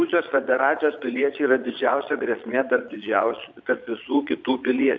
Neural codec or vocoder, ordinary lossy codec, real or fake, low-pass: vocoder, 22.05 kHz, 80 mel bands, Vocos; MP3, 64 kbps; fake; 7.2 kHz